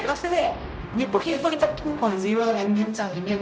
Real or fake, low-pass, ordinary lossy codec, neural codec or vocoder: fake; none; none; codec, 16 kHz, 0.5 kbps, X-Codec, HuBERT features, trained on general audio